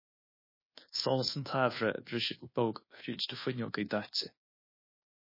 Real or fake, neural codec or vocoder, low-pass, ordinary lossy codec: fake; codec, 24 kHz, 1.2 kbps, DualCodec; 5.4 kHz; MP3, 24 kbps